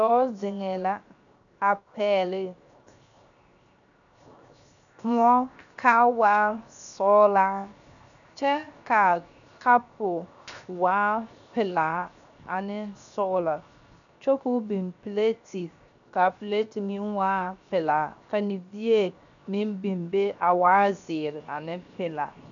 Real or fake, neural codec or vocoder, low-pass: fake; codec, 16 kHz, 0.7 kbps, FocalCodec; 7.2 kHz